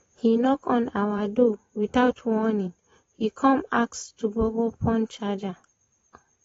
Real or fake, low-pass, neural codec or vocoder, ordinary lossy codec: fake; 19.8 kHz; vocoder, 44.1 kHz, 128 mel bands every 512 samples, BigVGAN v2; AAC, 24 kbps